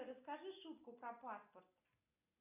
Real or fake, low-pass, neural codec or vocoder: real; 3.6 kHz; none